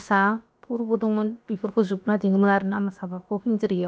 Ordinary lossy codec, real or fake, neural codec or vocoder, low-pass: none; fake; codec, 16 kHz, about 1 kbps, DyCAST, with the encoder's durations; none